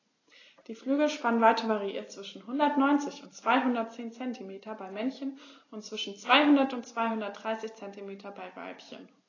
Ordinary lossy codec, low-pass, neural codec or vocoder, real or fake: AAC, 32 kbps; 7.2 kHz; none; real